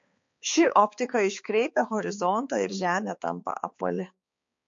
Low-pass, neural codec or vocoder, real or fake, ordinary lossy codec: 7.2 kHz; codec, 16 kHz, 4 kbps, X-Codec, HuBERT features, trained on balanced general audio; fake; MP3, 48 kbps